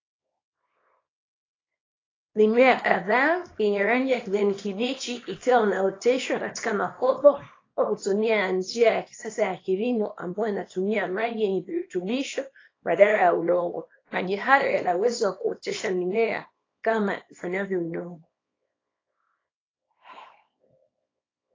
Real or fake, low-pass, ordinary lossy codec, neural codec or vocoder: fake; 7.2 kHz; AAC, 32 kbps; codec, 24 kHz, 0.9 kbps, WavTokenizer, small release